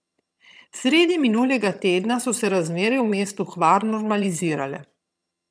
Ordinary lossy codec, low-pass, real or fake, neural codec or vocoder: none; none; fake; vocoder, 22.05 kHz, 80 mel bands, HiFi-GAN